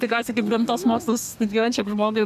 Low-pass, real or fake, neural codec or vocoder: 14.4 kHz; fake; codec, 32 kHz, 1.9 kbps, SNAC